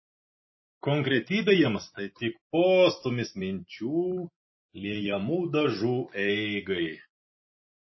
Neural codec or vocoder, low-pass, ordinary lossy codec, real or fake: none; 7.2 kHz; MP3, 24 kbps; real